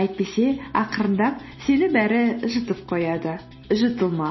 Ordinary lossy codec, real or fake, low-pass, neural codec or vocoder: MP3, 24 kbps; real; 7.2 kHz; none